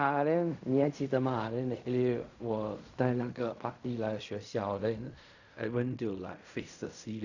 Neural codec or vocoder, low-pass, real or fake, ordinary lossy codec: codec, 16 kHz in and 24 kHz out, 0.4 kbps, LongCat-Audio-Codec, fine tuned four codebook decoder; 7.2 kHz; fake; none